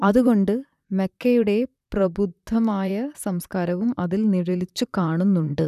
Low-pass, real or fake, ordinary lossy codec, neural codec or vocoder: 14.4 kHz; fake; none; vocoder, 44.1 kHz, 128 mel bands, Pupu-Vocoder